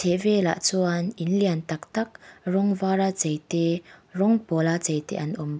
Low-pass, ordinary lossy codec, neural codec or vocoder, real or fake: none; none; none; real